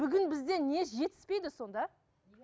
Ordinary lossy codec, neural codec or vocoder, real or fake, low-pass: none; none; real; none